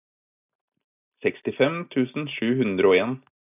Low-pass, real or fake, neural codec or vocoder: 3.6 kHz; real; none